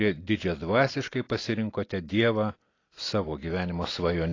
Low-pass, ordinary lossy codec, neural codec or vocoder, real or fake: 7.2 kHz; AAC, 32 kbps; none; real